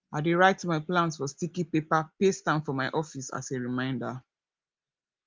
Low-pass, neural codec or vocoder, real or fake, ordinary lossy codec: 7.2 kHz; none; real; Opus, 24 kbps